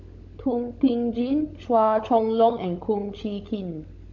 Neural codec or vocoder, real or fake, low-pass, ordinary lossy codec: codec, 16 kHz, 16 kbps, FunCodec, trained on LibriTTS, 50 frames a second; fake; 7.2 kHz; MP3, 64 kbps